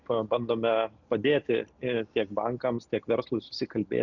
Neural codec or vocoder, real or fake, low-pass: none; real; 7.2 kHz